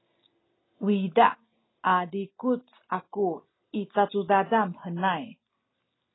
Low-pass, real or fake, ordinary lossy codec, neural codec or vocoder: 7.2 kHz; real; AAC, 16 kbps; none